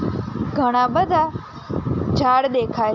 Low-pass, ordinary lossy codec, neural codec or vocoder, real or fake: 7.2 kHz; MP3, 48 kbps; none; real